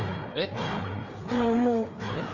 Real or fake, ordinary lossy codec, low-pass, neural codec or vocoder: fake; none; 7.2 kHz; codec, 16 kHz, 4 kbps, FreqCodec, larger model